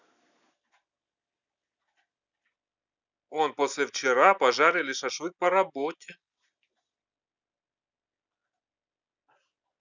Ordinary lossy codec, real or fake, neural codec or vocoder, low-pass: none; real; none; 7.2 kHz